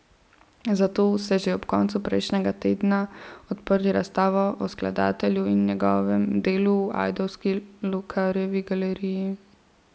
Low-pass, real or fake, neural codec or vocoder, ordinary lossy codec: none; real; none; none